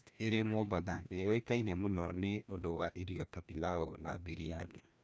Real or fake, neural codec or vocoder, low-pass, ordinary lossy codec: fake; codec, 16 kHz, 1 kbps, FreqCodec, larger model; none; none